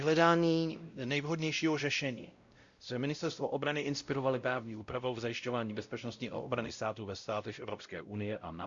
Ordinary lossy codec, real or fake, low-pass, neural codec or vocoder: Opus, 64 kbps; fake; 7.2 kHz; codec, 16 kHz, 0.5 kbps, X-Codec, WavLM features, trained on Multilingual LibriSpeech